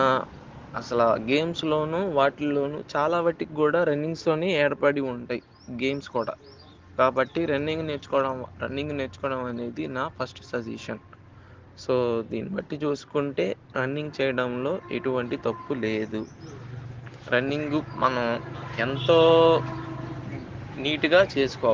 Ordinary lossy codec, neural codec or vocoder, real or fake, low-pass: Opus, 16 kbps; none; real; 7.2 kHz